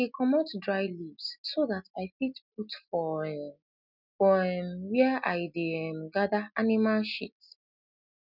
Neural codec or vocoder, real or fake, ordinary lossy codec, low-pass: none; real; none; 5.4 kHz